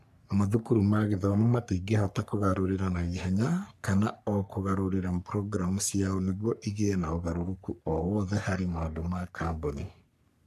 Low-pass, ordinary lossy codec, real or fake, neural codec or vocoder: 14.4 kHz; MP3, 96 kbps; fake; codec, 44.1 kHz, 3.4 kbps, Pupu-Codec